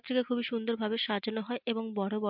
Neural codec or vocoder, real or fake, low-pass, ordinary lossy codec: none; real; 5.4 kHz; MP3, 48 kbps